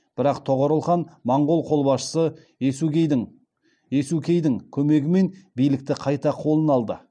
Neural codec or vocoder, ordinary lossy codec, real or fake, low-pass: none; none; real; 9.9 kHz